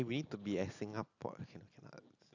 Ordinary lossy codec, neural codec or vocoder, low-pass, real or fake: none; none; 7.2 kHz; real